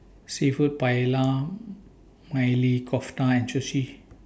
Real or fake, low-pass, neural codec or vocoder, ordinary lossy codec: real; none; none; none